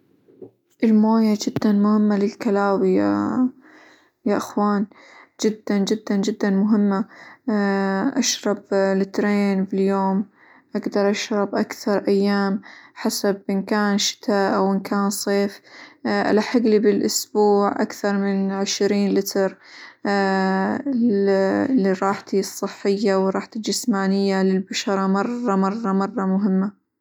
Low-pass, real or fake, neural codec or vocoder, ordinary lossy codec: 19.8 kHz; real; none; none